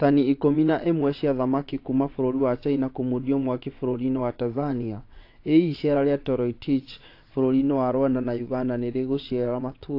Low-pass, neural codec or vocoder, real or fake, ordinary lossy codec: 5.4 kHz; vocoder, 22.05 kHz, 80 mel bands, WaveNeXt; fake; AAC, 32 kbps